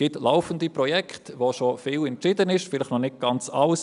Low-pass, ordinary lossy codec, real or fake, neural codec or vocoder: 10.8 kHz; none; real; none